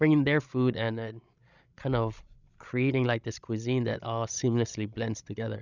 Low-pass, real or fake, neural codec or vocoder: 7.2 kHz; fake; codec, 16 kHz, 16 kbps, FreqCodec, larger model